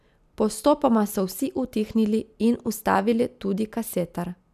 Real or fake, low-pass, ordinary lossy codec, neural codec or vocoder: real; 14.4 kHz; none; none